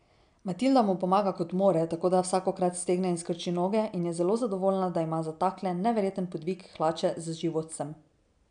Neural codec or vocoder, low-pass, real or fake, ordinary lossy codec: none; 9.9 kHz; real; MP3, 96 kbps